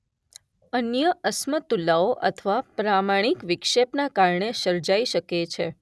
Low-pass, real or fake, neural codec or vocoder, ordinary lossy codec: none; real; none; none